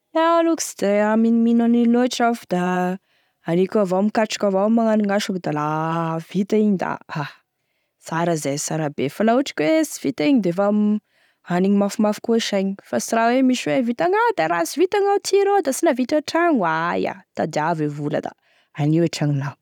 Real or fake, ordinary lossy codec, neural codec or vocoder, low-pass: real; none; none; 19.8 kHz